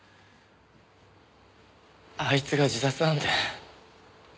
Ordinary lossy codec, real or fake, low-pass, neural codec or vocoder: none; real; none; none